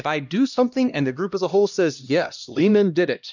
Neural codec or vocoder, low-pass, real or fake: codec, 16 kHz, 1 kbps, X-Codec, HuBERT features, trained on LibriSpeech; 7.2 kHz; fake